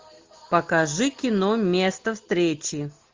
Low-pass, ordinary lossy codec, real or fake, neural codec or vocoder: 7.2 kHz; Opus, 32 kbps; real; none